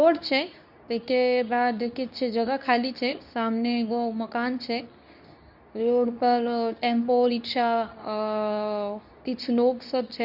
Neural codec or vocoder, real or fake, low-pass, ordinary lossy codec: codec, 24 kHz, 0.9 kbps, WavTokenizer, medium speech release version 2; fake; 5.4 kHz; none